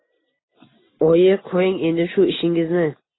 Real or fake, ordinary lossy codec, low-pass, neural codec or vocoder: real; AAC, 16 kbps; 7.2 kHz; none